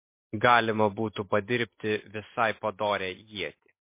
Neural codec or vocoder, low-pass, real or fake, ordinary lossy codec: none; 3.6 kHz; real; MP3, 24 kbps